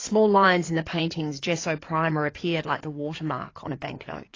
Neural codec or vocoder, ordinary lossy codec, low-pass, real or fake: codec, 16 kHz in and 24 kHz out, 2.2 kbps, FireRedTTS-2 codec; AAC, 32 kbps; 7.2 kHz; fake